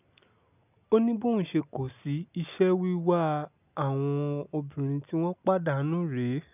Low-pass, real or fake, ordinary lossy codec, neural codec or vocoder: 3.6 kHz; real; none; none